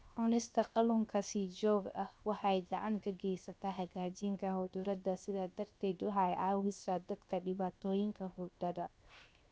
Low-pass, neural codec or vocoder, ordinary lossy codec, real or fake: none; codec, 16 kHz, 0.7 kbps, FocalCodec; none; fake